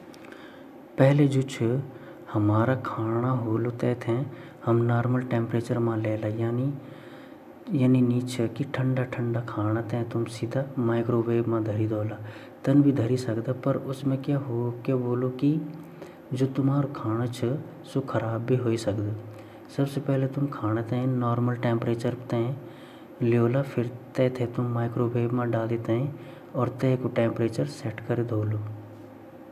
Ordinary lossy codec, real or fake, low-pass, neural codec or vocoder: AAC, 96 kbps; real; 14.4 kHz; none